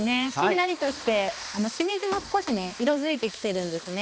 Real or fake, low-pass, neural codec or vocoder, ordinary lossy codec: fake; none; codec, 16 kHz, 2 kbps, X-Codec, HuBERT features, trained on balanced general audio; none